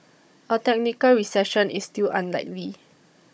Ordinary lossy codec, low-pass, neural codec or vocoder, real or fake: none; none; codec, 16 kHz, 16 kbps, FunCodec, trained on Chinese and English, 50 frames a second; fake